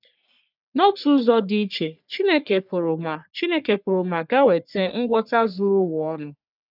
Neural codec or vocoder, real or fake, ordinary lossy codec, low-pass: codec, 44.1 kHz, 3.4 kbps, Pupu-Codec; fake; none; 5.4 kHz